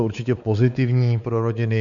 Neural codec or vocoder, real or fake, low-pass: codec, 16 kHz, 4 kbps, X-Codec, WavLM features, trained on Multilingual LibriSpeech; fake; 7.2 kHz